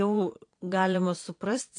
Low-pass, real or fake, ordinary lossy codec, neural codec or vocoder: 9.9 kHz; fake; AAC, 48 kbps; vocoder, 22.05 kHz, 80 mel bands, Vocos